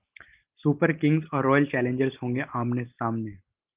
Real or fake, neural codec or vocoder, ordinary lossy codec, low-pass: real; none; Opus, 32 kbps; 3.6 kHz